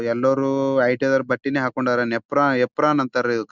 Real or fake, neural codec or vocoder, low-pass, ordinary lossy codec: real; none; 7.2 kHz; Opus, 64 kbps